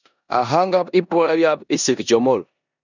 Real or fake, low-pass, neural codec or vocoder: fake; 7.2 kHz; codec, 16 kHz in and 24 kHz out, 0.9 kbps, LongCat-Audio-Codec, four codebook decoder